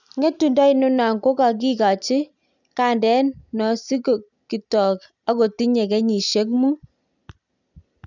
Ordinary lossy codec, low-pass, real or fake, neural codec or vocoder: none; 7.2 kHz; real; none